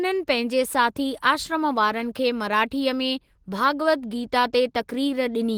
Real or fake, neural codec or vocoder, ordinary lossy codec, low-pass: real; none; Opus, 16 kbps; 19.8 kHz